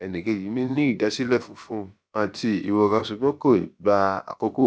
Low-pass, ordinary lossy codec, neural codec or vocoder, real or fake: none; none; codec, 16 kHz, about 1 kbps, DyCAST, with the encoder's durations; fake